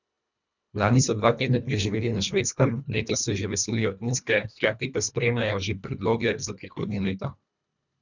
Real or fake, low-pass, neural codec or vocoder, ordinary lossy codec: fake; 7.2 kHz; codec, 24 kHz, 1.5 kbps, HILCodec; none